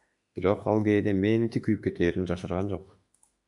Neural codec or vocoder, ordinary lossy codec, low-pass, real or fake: autoencoder, 48 kHz, 32 numbers a frame, DAC-VAE, trained on Japanese speech; MP3, 96 kbps; 10.8 kHz; fake